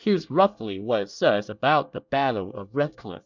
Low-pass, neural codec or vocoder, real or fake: 7.2 kHz; codec, 24 kHz, 1 kbps, SNAC; fake